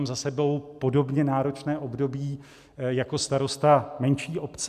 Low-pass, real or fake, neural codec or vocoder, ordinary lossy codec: 14.4 kHz; real; none; AAC, 96 kbps